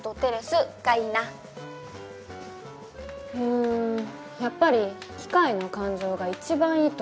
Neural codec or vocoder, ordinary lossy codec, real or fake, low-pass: none; none; real; none